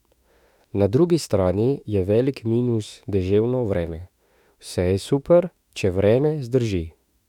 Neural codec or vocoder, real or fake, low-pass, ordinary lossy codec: autoencoder, 48 kHz, 32 numbers a frame, DAC-VAE, trained on Japanese speech; fake; 19.8 kHz; none